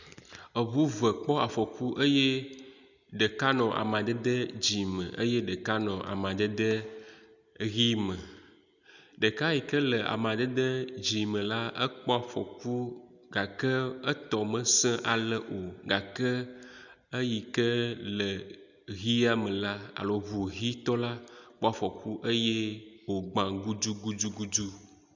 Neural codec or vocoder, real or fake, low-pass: none; real; 7.2 kHz